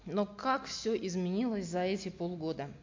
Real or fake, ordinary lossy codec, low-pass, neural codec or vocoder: real; MP3, 48 kbps; 7.2 kHz; none